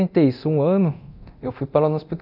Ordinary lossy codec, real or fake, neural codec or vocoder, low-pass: none; fake; codec, 24 kHz, 0.9 kbps, DualCodec; 5.4 kHz